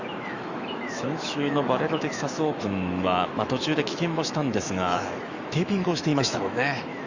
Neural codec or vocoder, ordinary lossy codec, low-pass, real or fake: autoencoder, 48 kHz, 128 numbers a frame, DAC-VAE, trained on Japanese speech; Opus, 64 kbps; 7.2 kHz; fake